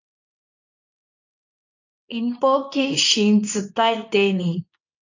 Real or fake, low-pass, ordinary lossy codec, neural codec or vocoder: fake; 7.2 kHz; AAC, 48 kbps; codec, 24 kHz, 0.9 kbps, WavTokenizer, medium speech release version 2